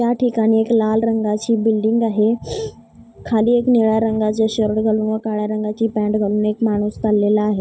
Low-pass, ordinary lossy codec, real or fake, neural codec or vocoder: none; none; real; none